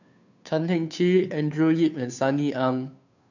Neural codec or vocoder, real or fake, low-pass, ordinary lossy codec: codec, 16 kHz, 2 kbps, FunCodec, trained on Chinese and English, 25 frames a second; fake; 7.2 kHz; none